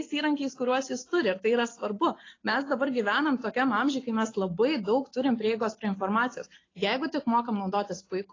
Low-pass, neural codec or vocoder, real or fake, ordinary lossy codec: 7.2 kHz; none; real; AAC, 32 kbps